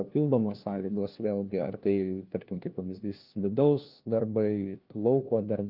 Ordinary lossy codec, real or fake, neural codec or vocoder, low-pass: Opus, 24 kbps; fake; codec, 16 kHz, 1 kbps, FunCodec, trained on LibriTTS, 50 frames a second; 5.4 kHz